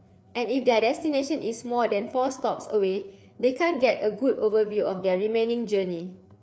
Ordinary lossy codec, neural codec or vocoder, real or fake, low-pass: none; codec, 16 kHz, 4 kbps, FreqCodec, larger model; fake; none